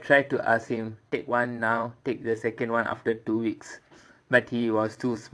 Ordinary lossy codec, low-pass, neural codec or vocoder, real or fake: none; none; vocoder, 22.05 kHz, 80 mel bands, WaveNeXt; fake